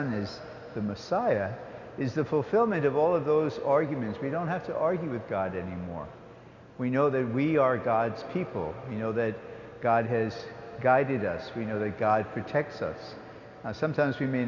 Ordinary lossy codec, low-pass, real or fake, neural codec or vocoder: Opus, 64 kbps; 7.2 kHz; real; none